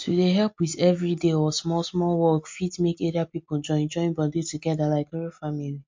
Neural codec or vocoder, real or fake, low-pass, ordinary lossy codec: none; real; 7.2 kHz; MP3, 48 kbps